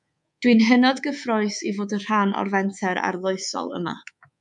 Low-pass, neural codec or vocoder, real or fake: 10.8 kHz; codec, 24 kHz, 3.1 kbps, DualCodec; fake